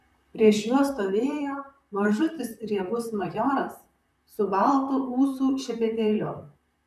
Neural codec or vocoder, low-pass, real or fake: vocoder, 44.1 kHz, 128 mel bands, Pupu-Vocoder; 14.4 kHz; fake